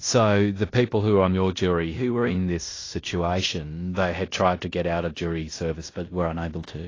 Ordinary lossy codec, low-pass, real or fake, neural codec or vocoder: AAC, 32 kbps; 7.2 kHz; fake; codec, 16 kHz in and 24 kHz out, 0.9 kbps, LongCat-Audio-Codec, fine tuned four codebook decoder